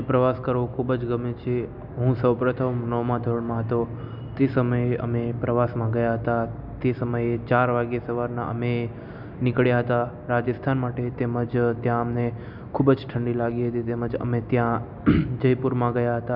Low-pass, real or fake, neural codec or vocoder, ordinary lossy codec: 5.4 kHz; real; none; none